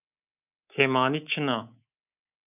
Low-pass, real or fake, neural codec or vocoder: 3.6 kHz; fake; codec, 24 kHz, 3.1 kbps, DualCodec